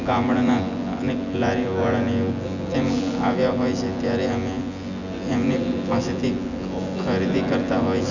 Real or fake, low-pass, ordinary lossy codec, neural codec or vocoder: fake; 7.2 kHz; none; vocoder, 24 kHz, 100 mel bands, Vocos